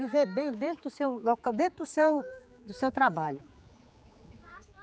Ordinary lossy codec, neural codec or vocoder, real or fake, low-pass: none; codec, 16 kHz, 4 kbps, X-Codec, HuBERT features, trained on general audio; fake; none